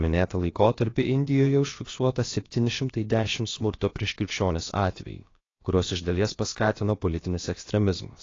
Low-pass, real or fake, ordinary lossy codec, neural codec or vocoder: 7.2 kHz; fake; AAC, 32 kbps; codec, 16 kHz, about 1 kbps, DyCAST, with the encoder's durations